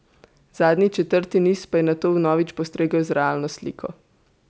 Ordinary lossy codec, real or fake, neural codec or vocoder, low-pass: none; real; none; none